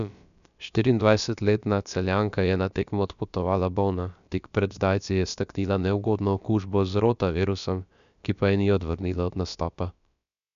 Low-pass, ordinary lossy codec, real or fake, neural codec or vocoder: 7.2 kHz; none; fake; codec, 16 kHz, about 1 kbps, DyCAST, with the encoder's durations